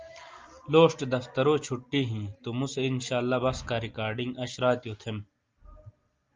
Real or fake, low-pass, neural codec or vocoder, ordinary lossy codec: real; 7.2 kHz; none; Opus, 24 kbps